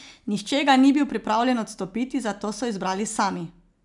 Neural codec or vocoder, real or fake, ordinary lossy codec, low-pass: none; real; none; 10.8 kHz